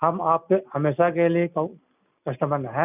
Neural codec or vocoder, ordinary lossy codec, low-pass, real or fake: none; none; 3.6 kHz; real